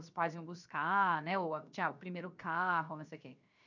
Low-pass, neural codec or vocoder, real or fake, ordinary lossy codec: 7.2 kHz; codec, 16 kHz, 0.7 kbps, FocalCodec; fake; none